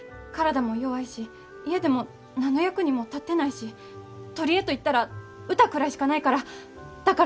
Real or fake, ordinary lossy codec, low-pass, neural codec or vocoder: real; none; none; none